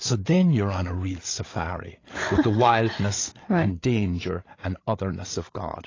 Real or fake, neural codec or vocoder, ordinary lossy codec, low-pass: fake; codec, 16 kHz, 8 kbps, FreqCodec, larger model; AAC, 32 kbps; 7.2 kHz